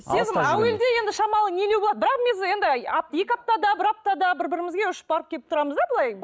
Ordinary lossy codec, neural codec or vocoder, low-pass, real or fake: none; none; none; real